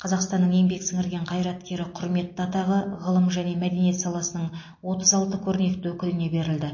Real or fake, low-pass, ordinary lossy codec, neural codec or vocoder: real; 7.2 kHz; MP3, 32 kbps; none